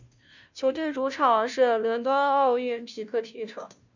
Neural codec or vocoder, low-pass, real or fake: codec, 16 kHz, 0.5 kbps, FunCodec, trained on Chinese and English, 25 frames a second; 7.2 kHz; fake